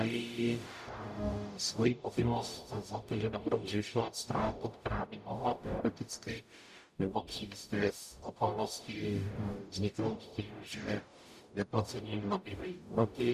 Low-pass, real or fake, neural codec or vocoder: 14.4 kHz; fake; codec, 44.1 kHz, 0.9 kbps, DAC